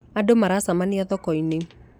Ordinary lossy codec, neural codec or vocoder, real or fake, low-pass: none; none; real; 19.8 kHz